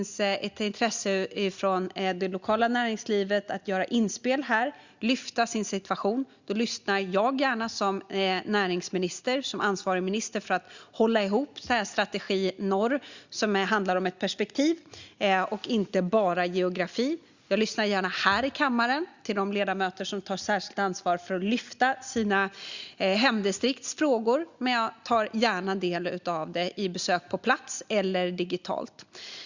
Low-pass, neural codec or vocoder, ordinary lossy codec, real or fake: 7.2 kHz; none; Opus, 64 kbps; real